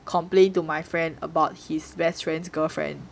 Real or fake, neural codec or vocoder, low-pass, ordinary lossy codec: real; none; none; none